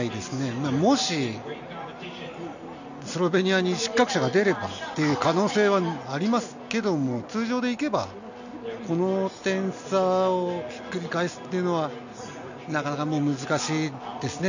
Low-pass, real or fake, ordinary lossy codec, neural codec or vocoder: 7.2 kHz; real; none; none